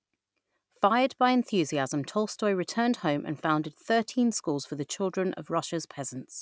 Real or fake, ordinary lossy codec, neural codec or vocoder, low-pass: real; none; none; none